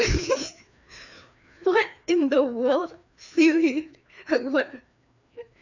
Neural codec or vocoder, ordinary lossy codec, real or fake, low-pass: codec, 16 kHz, 2 kbps, FreqCodec, larger model; none; fake; 7.2 kHz